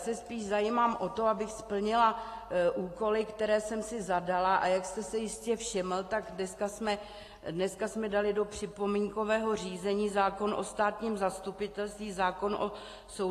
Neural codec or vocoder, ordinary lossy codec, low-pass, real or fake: none; AAC, 48 kbps; 14.4 kHz; real